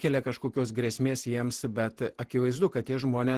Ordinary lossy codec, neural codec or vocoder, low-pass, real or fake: Opus, 16 kbps; vocoder, 48 kHz, 128 mel bands, Vocos; 14.4 kHz; fake